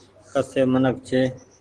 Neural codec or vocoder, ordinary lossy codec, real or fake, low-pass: none; Opus, 16 kbps; real; 9.9 kHz